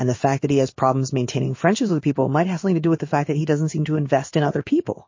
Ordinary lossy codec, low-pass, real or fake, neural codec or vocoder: MP3, 32 kbps; 7.2 kHz; fake; codec, 16 kHz in and 24 kHz out, 1 kbps, XY-Tokenizer